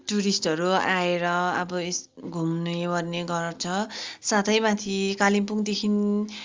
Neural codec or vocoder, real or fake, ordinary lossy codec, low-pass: none; real; Opus, 32 kbps; 7.2 kHz